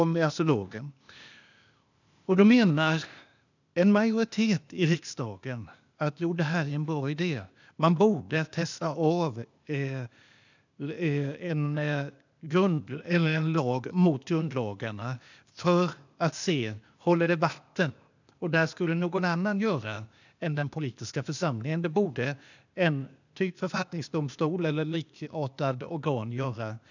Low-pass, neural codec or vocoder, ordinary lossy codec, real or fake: 7.2 kHz; codec, 16 kHz, 0.8 kbps, ZipCodec; none; fake